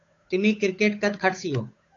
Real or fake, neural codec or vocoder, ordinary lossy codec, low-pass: fake; codec, 16 kHz, 8 kbps, FunCodec, trained on Chinese and English, 25 frames a second; AAC, 48 kbps; 7.2 kHz